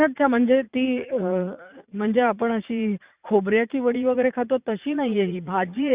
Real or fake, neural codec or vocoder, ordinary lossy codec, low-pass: fake; vocoder, 44.1 kHz, 80 mel bands, Vocos; Opus, 64 kbps; 3.6 kHz